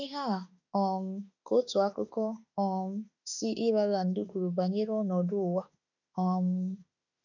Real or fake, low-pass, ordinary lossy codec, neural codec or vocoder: fake; 7.2 kHz; none; autoencoder, 48 kHz, 32 numbers a frame, DAC-VAE, trained on Japanese speech